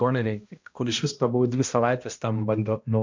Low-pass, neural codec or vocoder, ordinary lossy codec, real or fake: 7.2 kHz; codec, 16 kHz, 1 kbps, X-Codec, HuBERT features, trained on balanced general audio; MP3, 48 kbps; fake